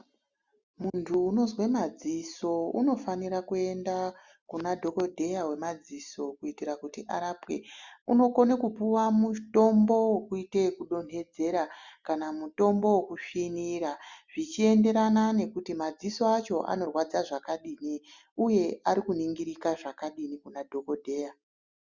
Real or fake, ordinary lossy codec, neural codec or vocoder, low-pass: real; Opus, 64 kbps; none; 7.2 kHz